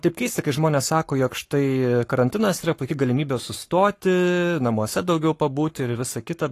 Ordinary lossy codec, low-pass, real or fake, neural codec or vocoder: AAC, 48 kbps; 14.4 kHz; fake; codec, 44.1 kHz, 7.8 kbps, Pupu-Codec